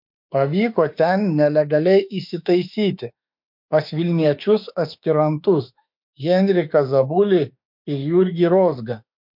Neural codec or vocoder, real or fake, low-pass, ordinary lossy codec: autoencoder, 48 kHz, 32 numbers a frame, DAC-VAE, trained on Japanese speech; fake; 5.4 kHz; MP3, 48 kbps